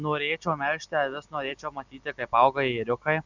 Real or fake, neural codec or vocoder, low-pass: real; none; 7.2 kHz